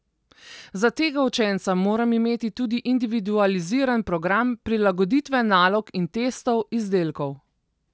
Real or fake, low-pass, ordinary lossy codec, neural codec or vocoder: real; none; none; none